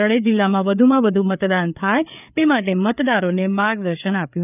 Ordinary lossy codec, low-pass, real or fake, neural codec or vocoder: none; 3.6 kHz; fake; codec, 16 kHz, 4 kbps, FreqCodec, larger model